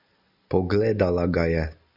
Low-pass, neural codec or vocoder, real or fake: 5.4 kHz; none; real